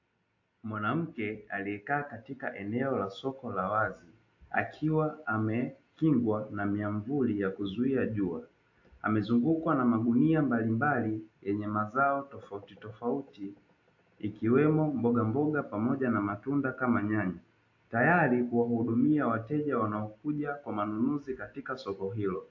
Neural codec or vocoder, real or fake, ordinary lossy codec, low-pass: none; real; AAC, 48 kbps; 7.2 kHz